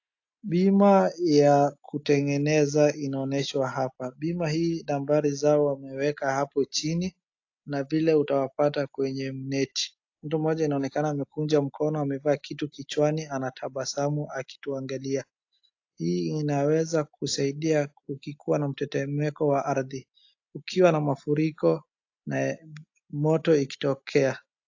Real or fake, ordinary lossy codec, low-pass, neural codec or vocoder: real; AAC, 48 kbps; 7.2 kHz; none